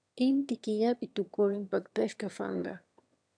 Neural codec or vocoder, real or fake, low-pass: autoencoder, 22.05 kHz, a latent of 192 numbers a frame, VITS, trained on one speaker; fake; 9.9 kHz